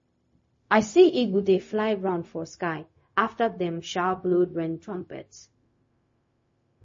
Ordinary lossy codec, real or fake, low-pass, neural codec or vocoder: MP3, 32 kbps; fake; 7.2 kHz; codec, 16 kHz, 0.4 kbps, LongCat-Audio-Codec